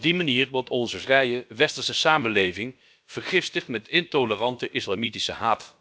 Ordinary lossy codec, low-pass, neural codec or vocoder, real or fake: none; none; codec, 16 kHz, about 1 kbps, DyCAST, with the encoder's durations; fake